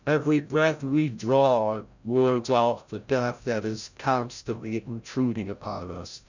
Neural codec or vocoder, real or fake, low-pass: codec, 16 kHz, 0.5 kbps, FreqCodec, larger model; fake; 7.2 kHz